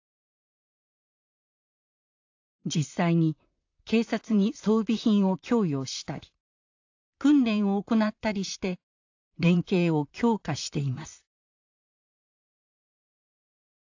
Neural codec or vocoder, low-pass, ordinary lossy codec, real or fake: vocoder, 44.1 kHz, 128 mel bands, Pupu-Vocoder; 7.2 kHz; AAC, 48 kbps; fake